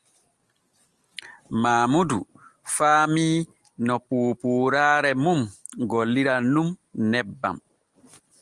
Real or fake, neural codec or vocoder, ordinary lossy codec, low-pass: real; none; Opus, 24 kbps; 10.8 kHz